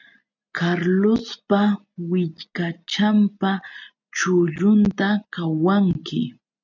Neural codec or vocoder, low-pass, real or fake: none; 7.2 kHz; real